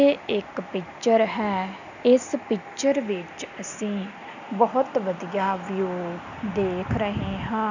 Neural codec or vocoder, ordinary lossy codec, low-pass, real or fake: none; none; 7.2 kHz; real